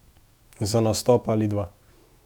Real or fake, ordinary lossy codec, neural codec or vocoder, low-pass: fake; Opus, 64 kbps; autoencoder, 48 kHz, 128 numbers a frame, DAC-VAE, trained on Japanese speech; 19.8 kHz